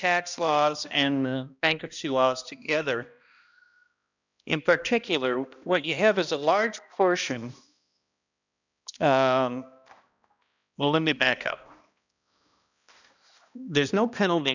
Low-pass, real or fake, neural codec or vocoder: 7.2 kHz; fake; codec, 16 kHz, 1 kbps, X-Codec, HuBERT features, trained on balanced general audio